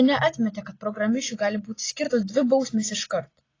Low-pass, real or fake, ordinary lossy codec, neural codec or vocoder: 7.2 kHz; real; AAC, 32 kbps; none